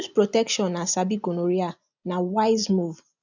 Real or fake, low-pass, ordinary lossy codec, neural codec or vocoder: real; 7.2 kHz; none; none